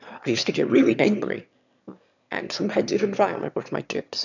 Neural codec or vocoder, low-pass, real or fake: autoencoder, 22.05 kHz, a latent of 192 numbers a frame, VITS, trained on one speaker; 7.2 kHz; fake